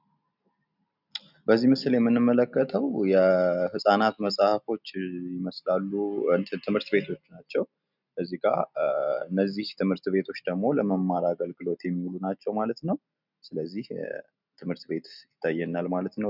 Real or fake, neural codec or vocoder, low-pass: real; none; 5.4 kHz